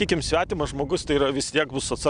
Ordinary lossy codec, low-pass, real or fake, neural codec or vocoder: Opus, 64 kbps; 9.9 kHz; real; none